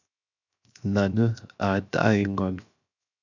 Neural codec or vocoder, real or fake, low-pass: codec, 16 kHz, 0.7 kbps, FocalCodec; fake; 7.2 kHz